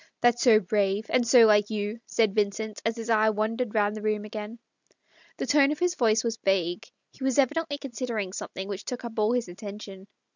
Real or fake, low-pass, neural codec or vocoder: real; 7.2 kHz; none